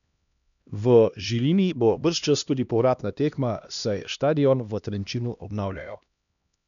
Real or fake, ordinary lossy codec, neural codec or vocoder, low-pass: fake; none; codec, 16 kHz, 1 kbps, X-Codec, HuBERT features, trained on LibriSpeech; 7.2 kHz